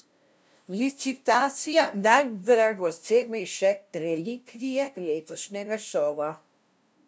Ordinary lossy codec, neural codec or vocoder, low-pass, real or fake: none; codec, 16 kHz, 0.5 kbps, FunCodec, trained on LibriTTS, 25 frames a second; none; fake